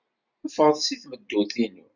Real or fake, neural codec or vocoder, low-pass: real; none; 7.2 kHz